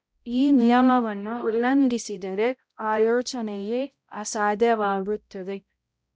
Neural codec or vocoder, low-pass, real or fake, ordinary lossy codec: codec, 16 kHz, 0.5 kbps, X-Codec, HuBERT features, trained on balanced general audio; none; fake; none